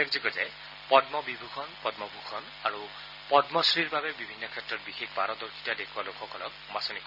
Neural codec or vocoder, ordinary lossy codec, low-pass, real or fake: none; none; 5.4 kHz; real